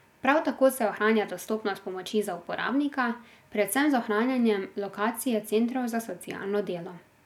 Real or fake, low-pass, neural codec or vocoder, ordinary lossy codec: real; 19.8 kHz; none; none